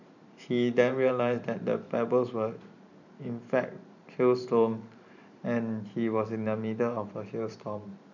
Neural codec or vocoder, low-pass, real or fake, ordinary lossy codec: vocoder, 44.1 kHz, 128 mel bands every 512 samples, BigVGAN v2; 7.2 kHz; fake; none